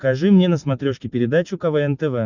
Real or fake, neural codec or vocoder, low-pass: real; none; 7.2 kHz